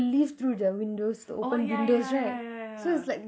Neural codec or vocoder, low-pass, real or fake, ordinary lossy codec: none; none; real; none